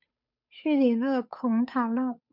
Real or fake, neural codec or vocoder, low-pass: fake; codec, 16 kHz, 8 kbps, FunCodec, trained on Chinese and English, 25 frames a second; 5.4 kHz